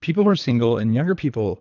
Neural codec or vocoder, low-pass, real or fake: codec, 24 kHz, 3 kbps, HILCodec; 7.2 kHz; fake